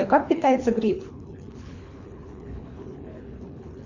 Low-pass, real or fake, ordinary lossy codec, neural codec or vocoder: 7.2 kHz; fake; Opus, 64 kbps; codec, 24 kHz, 3 kbps, HILCodec